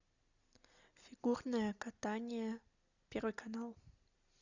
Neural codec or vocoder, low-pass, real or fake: none; 7.2 kHz; real